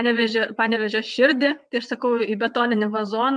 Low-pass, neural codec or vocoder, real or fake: 9.9 kHz; vocoder, 22.05 kHz, 80 mel bands, WaveNeXt; fake